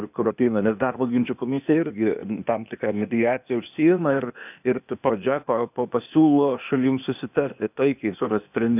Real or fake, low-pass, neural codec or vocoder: fake; 3.6 kHz; codec, 16 kHz, 0.8 kbps, ZipCodec